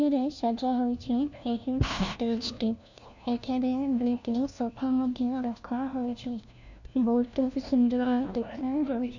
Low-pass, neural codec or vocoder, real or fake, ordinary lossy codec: 7.2 kHz; codec, 16 kHz, 1 kbps, FunCodec, trained on LibriTTS, 50 frames a second; fake; none